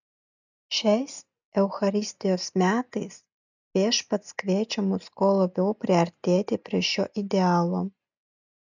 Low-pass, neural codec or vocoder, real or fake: 7.2 kHz; none; real